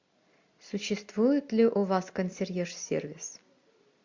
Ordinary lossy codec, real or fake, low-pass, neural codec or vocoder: MP3, 48 kbps; real; 7.2 kHz; none